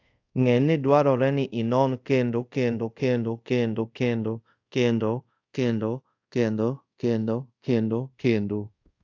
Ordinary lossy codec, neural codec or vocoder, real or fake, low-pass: AAC, 48 kbps; codec, 24 kHz, 0.5 kbps, DualCodec; fake; 7.2 kHz